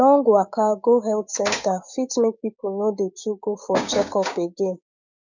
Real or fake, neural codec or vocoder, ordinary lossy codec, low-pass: fake; codec, 16 kHz, 6 kbps, DAC; none; 7.2 kHz